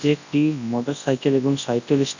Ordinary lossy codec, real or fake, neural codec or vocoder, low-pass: none; fake; codec, 24 kHz, 0.9 kbps, WavTokenizer, large speech release; 7.2 kHz